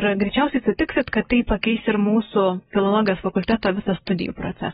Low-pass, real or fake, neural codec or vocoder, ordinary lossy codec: 19.8 kHz; real; none; AAC, 16 kbps